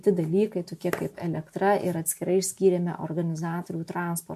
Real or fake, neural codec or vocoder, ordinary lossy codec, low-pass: real; none; MP3, 64 kbps; 14.4 kHz